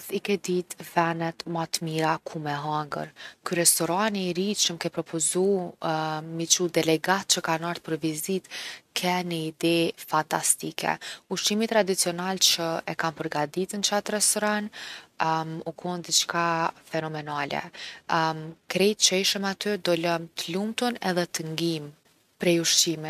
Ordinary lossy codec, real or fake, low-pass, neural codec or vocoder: none; real; 14.4 kHz; none